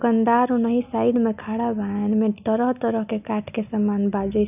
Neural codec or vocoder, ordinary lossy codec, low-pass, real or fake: none; none; 3.6 kHz; real